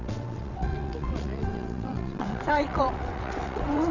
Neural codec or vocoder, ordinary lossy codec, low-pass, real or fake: vocoder, 22.05 kHz, 80 mel bands, WaveNeXt; none; 7.2 kHz; fake